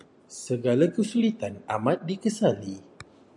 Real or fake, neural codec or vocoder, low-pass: real; none; 10.8 kHz